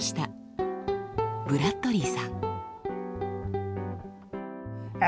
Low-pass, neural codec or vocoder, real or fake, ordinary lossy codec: none; none; real; none